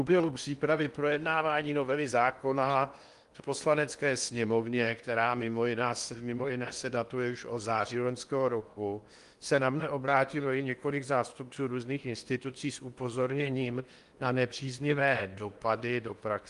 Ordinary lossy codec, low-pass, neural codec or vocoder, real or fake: Opus, 32 kbps; 10.8 kHz; codec, 16 kHz in and 24 kHz out, 0.8 kbps, FocalCodec, streaming, 65536 codes; fake